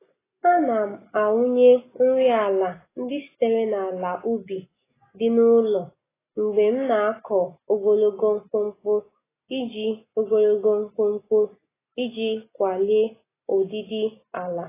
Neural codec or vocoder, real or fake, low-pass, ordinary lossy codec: none; real; 3.6 kHz; AAC, 16 kbps